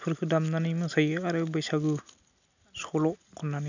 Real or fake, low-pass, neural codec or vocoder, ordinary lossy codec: real; 7.2 kHz; none; none